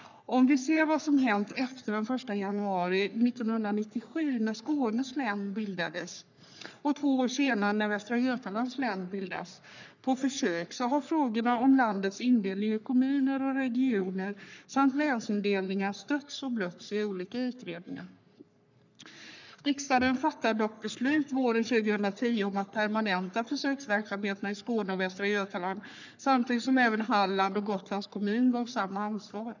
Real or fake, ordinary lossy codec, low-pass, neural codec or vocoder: fake; none; 7.2 kHz; codec, 44.1 kHz, 3.4 kbps, Pupu-Codec